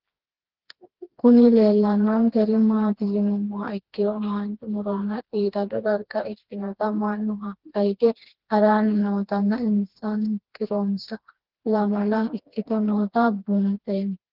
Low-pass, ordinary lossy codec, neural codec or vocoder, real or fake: 5.4 kHz; Opus, 16 kbps; codec, 16 kHz, 2 kbps, FreqCodec, smaller model; fake